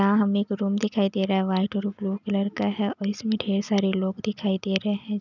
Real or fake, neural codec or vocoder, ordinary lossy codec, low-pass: real; none; none; 7.2 kHz